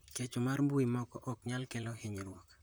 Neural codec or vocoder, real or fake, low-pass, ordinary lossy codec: vocoder, 44.1 kHz, 128 mel bands, Pupu-Vocoder; fake; none; none